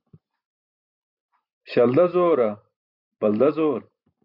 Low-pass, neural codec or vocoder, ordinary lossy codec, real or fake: 5.4 kHz; vocoder, 44.1 kHz, 128 mel bands every 256 samples, BigVGAN v2; MP3, 48 kbps; fake